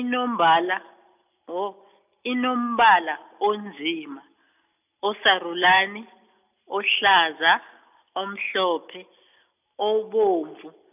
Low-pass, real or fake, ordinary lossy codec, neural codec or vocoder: 3.6 kHz; real; none; none